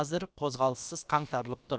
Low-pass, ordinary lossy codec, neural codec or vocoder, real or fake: none; none; codec, 16 kHz, about 1 kbps, DyCAST, with the encoder's durations; fake